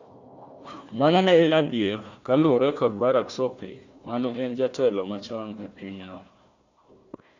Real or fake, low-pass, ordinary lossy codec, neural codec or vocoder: fake; 7.2 kHz; Opus, 64 kbps; codec, 16 kHz, 1 kbps, FunCodec, trained on Chinese and English, 50 frames a second